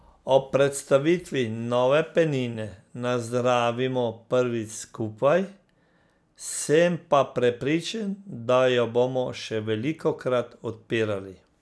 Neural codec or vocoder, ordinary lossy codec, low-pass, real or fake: none; none; none; real